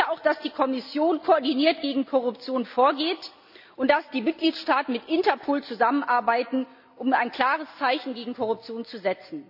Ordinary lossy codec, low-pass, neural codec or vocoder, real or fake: AAC, 48 kbps; 5.4 kHz; none; real